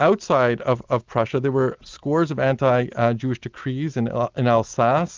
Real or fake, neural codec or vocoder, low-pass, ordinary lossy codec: real; none; 7.2 kHz; Opus, 24 kbps